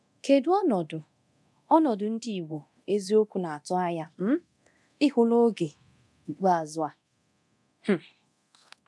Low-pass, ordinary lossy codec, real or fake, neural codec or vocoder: none; none; fake; codec, 24 kHz, 0.9 kbps, DualCodec